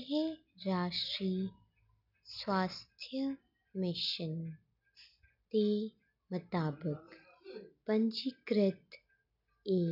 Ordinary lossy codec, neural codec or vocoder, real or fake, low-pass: none; none; real; 5.4 kHz